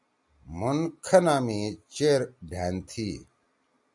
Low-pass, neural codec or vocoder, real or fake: 10.8 kHz; none; real